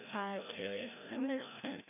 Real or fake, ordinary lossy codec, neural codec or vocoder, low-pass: fake; none; codec, 16 kHz, 1 kbps, FreqCodec, larger model; 3.6 kHz